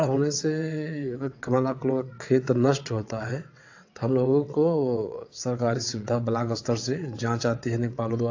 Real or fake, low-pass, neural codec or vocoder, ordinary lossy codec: fake; 7.2 kHz; vocoder, 22.05 kHz, 80 mel bands, WaveNeXt; AAC, 48 kbps